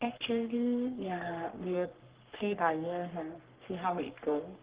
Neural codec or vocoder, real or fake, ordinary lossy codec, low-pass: codec, 44.1 kHz, 3.4 kbps, Pupu-Codec; fake; Opus, 16 kbps; 3.6 kHz